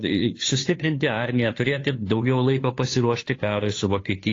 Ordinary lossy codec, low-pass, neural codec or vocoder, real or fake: AAC, 32 kbps; 7.2 kHz; codec, 16 kHz, 2 kbps, FunCodec, trained on Chinese and English, 25 frames a second; fake